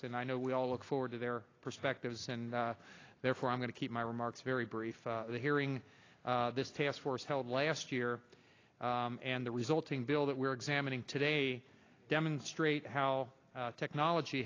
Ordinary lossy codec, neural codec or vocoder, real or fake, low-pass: AAC, 32 kbps; none; real; 7.2 kHz